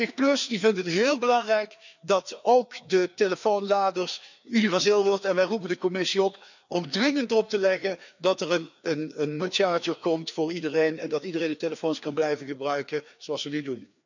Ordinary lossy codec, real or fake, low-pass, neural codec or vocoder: none; fake; 7.2 kHz; codec, 16 kHz, 2 kbps, FreqCodec, larger model